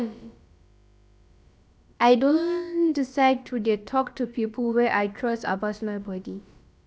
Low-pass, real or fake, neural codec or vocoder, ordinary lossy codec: none; fake; codec, 16 kHz, about 1 kbps, DyCAST, with the encoder's durations; none